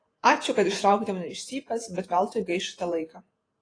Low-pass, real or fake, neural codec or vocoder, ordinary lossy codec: 9.9 kHz; fake; vocoder, 48 kHz, 128 mel bands, Vocos; AAC, 32 kbps